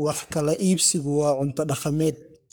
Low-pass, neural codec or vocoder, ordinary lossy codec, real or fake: none; codec, 44.1 kHz, 3.4 kbps, Pupu-Codec; none; fake